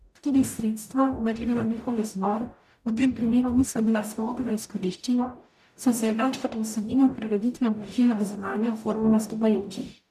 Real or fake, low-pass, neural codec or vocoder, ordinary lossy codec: fake; 14.4 kHz; codec, 44.1 kHz, 0.9 kbps, DAC; none